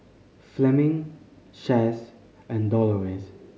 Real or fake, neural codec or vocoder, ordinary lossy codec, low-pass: real; none; none; none